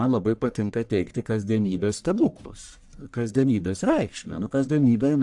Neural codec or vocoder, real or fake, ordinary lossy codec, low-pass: codec, 44.1 kHz, 1.7 kbps, Pupu-Codec; fake; AAC, 64 kbps; 10.8 kHz